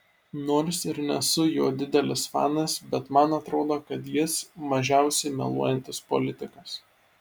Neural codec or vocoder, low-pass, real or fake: none; 19.8 kHz; real